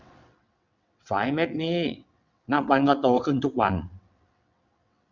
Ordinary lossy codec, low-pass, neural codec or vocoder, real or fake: none; 7.2 kHz; vocoder, 22.05 kHz, 80 mel bands, WaveNeXt; fake